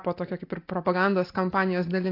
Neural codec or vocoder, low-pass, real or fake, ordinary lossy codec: none; 5.4 kHz; real; AAC, 32 kbps